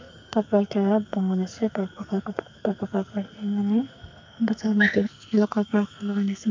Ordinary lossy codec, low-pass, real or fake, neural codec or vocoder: MP3, 64 kbps; 7.2 kHz; fake; codec, 44.1 kHz, 2.6 kbps, SNAC